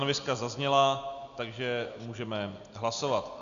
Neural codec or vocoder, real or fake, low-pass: none; real; 7.2 kHz